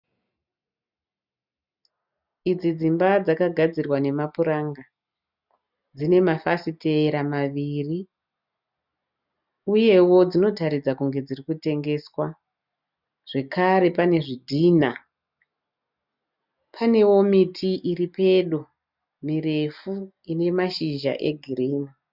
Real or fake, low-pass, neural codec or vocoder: real; 5.4 kHz; none